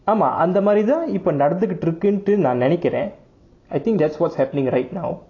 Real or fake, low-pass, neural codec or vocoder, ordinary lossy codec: real; 7.2 kHz; none; AAC, 32 kbps